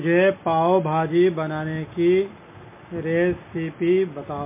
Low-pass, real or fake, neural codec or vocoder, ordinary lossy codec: 3.6 kHz; real; none; MP3, 24 kbps